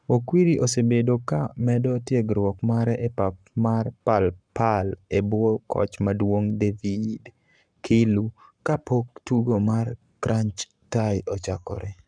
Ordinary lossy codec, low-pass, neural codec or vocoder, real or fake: none; 9.9 kHz; codec, 44.1 kHz, 7.8 kbps, Pupu-Codec; fake